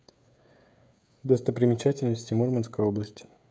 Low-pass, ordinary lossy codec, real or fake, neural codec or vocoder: none; none; fake; codec, 16 kHz, 16 kbps, FreqCodec, smaller model